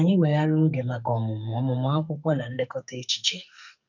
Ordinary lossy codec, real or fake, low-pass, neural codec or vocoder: none; fake; 7.2 kHz; codec, 44.1 kHz, 2.6 kbps, SNAC